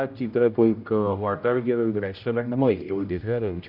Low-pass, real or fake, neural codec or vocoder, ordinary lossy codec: 5.4 kHz; fake; codec, 16 kHz, 0.5 kbps, X-Codec, HuBERT features, trained on general audio; none